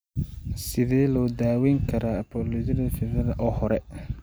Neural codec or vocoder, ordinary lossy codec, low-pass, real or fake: none; none; none; real